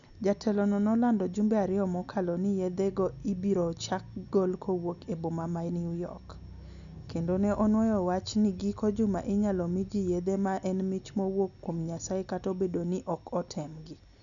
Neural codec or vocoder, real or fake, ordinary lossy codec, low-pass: none; real; none; 7.2 kHz